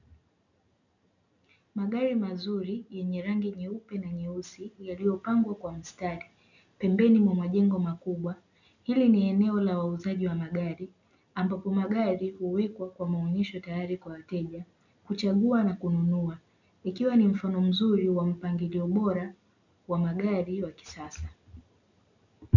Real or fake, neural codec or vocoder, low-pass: real; none; 7.2 kHz